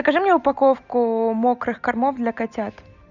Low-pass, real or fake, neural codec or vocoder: 7.2 kHz; real; none